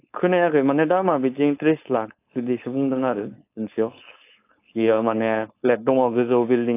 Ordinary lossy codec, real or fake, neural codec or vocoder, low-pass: AAC, 24 kbps; fake; codec, 16 kHz, 4.8 kbps, FACodec; 3.6 kHz